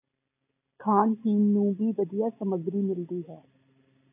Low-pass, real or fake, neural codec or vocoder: 3.6 kHz; real; none